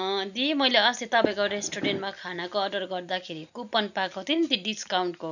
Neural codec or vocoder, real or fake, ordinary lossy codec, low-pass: none; real; none; 7.2 kHz